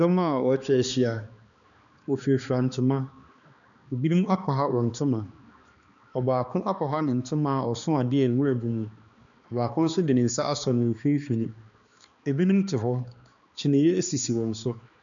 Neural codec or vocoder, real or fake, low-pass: codec, 16 kHz, 2 kbps, X-Codec, HuBERT features, trained on balanced general audio; fake; 7.2 kHz